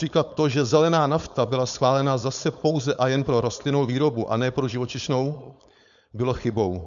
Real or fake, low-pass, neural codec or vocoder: fake; 7.2 kHz; codec, 16 kHz, 4.8 kbps, FACodec